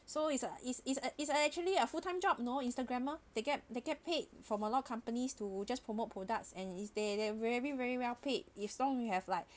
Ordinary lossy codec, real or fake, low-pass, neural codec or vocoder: none; real; none; none